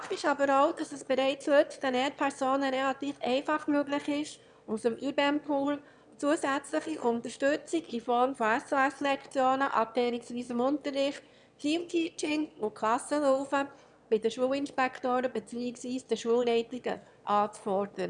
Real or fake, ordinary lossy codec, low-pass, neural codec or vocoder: fake; none; 9.9 kHz; autoencoder, 22.05 kHz, a latent of 192 numbers a frame, VITS, trained on one speaker